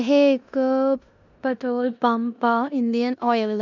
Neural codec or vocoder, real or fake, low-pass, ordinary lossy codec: codec, 16 kHz in and 24 kHz out, 0.9 kbps, LongCat-Audio-Codec, four codebook decoder; fake; 7.2 kHz; none